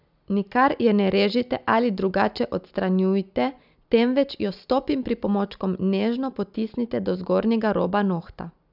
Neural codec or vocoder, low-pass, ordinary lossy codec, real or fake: none; 5.4 kHz; none; real